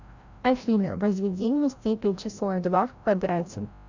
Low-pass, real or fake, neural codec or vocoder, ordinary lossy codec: 7.2 kHz; fake; codec, 16 kHz, 0.5 kbps, FreqCodec, larger model; Opus, 64 kbps